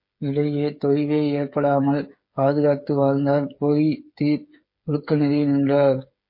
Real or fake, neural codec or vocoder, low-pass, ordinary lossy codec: fake; codec, 16 kHz, 8 kbps, FreqCodec, smaller model; 5.4 kHz; MP3, 32 kbps